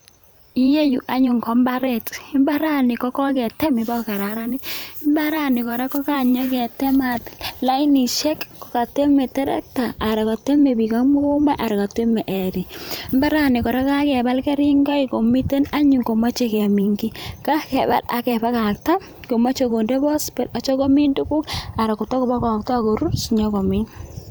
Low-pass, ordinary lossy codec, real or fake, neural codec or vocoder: none; none; fake; vocoder, 44.1 kHz, 128 mel bands every 512 samples, BigVGAN v2